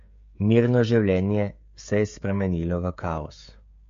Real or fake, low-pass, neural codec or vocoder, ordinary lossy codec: fake; 7.2 kHz; codec, 16 kHz, 16 kbps, FreqCodec, smaller model; MP3, 48 kbps